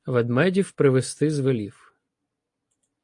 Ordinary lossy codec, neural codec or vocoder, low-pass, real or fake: AAC, 64 kbps; none; 10.8 kHz; real